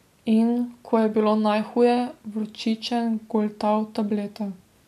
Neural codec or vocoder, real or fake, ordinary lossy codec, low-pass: none; real; none; 14.4 kHz